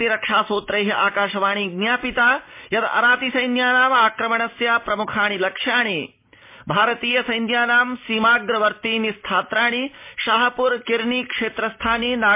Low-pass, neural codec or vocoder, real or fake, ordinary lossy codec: 3.6 kHz; none; real; MP3, 24 kbps